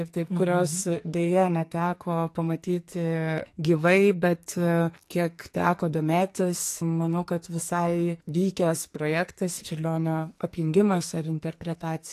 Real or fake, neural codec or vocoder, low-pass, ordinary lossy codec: fake; codec, 44.1 kHz, 2.6 kbps, SNAC; 14.4 kHz; AAC, 64 kbps